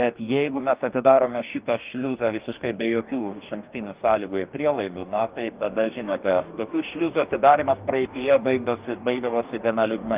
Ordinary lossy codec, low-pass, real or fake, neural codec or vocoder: Opus, 32 kbps; 3.6 kHz; fake; codec, 44.1 kHz, 2.6 kbps, DAC